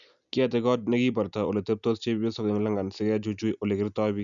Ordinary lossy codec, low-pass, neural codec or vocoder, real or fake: none; 7.2 kHz; none; real